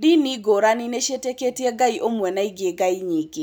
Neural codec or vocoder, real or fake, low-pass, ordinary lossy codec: none; real; none; none